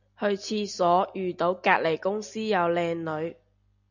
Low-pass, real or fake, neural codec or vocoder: 7.2 kHz; real; none